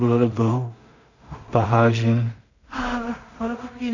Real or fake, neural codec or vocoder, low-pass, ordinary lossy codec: fake; codec, 16 kHz in and 24 kHz out, 0.4 kbps, LongCat-Audio-Codec, two codebook decoder; 7.2 kHz; none